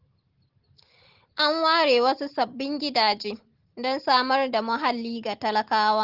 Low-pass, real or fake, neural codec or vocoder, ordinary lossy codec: 5.4 kHz; real; none; Opus, 16 kbps